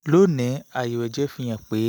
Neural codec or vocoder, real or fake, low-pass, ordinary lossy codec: none; real; none; none